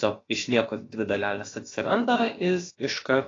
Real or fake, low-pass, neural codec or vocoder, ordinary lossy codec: fake; 7.2 kHz; codec, 16 kHz, about 1 kbps, DyCAST, with the encoder's durations; AAC, 32 kbps